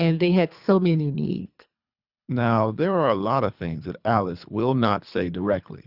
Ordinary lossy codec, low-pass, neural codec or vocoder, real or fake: Opus, 64 kbps; 5.4 kHz; codec, 24 kHz, 3 kbps, HILCodec; fake